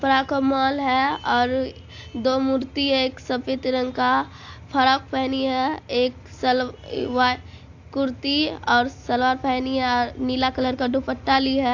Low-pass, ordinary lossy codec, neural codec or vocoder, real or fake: 7.2 kHz; AAC, 48 kbps; none; real